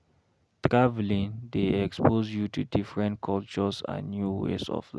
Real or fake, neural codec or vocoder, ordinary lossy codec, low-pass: real; none; none; none